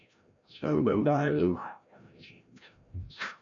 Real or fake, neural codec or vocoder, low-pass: fake; codec, 16 kHz, 0.5 kbps, FreqCodec, larger model; 7.2 kHz